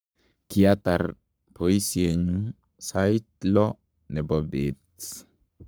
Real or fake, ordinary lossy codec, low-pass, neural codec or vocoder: fake; none; none; codec, 44.1 kHz, 7.8 kbps, Pupu-Codec